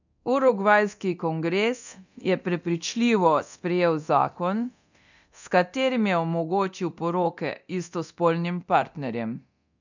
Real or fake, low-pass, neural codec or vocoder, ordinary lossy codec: fake; 7.2 kHz; codec, 24 kHz, 0.9 kbps, DualCodec; none